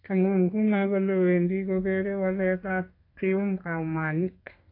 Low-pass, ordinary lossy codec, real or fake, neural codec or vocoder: 5.4 kHz; AAC, 32 kbps; fake; codec, 32 kHz, 1.9 kbps, SNAC